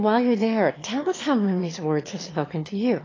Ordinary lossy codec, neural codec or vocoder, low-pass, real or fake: AAC, 32 kbps; autoencoder, 22.05 kHz, a latent of 192 numbers a frame, VITS, trained on one speaker; 7.2 kHz; fake